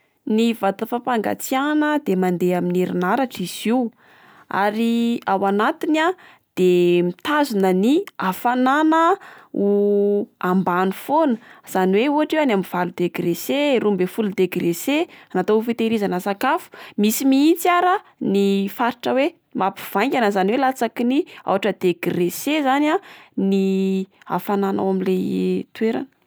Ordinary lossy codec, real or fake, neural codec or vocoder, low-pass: none; real; none; none